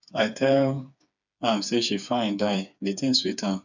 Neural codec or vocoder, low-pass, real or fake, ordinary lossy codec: codec, 16 kHz, 4 kbps, FreqCodec, smaller model; 7.2 kHz; fake; none